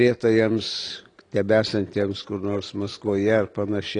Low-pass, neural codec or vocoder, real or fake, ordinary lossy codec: 9.9 kHz; none; real; AAC, 32 kbps